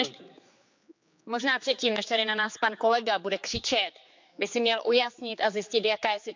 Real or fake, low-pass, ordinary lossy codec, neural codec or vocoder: fake; 7.2 kHz; MP3, 64 kbps; codec, 16 kHz, 4 kbps, X-Codec, HuBERT features, trained on general audio